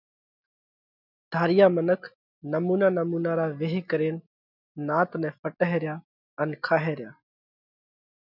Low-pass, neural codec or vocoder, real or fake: 5.4 kHz; none; real